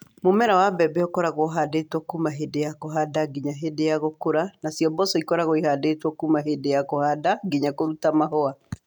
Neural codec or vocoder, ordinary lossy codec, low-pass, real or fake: none; none; 19.8 kHz; real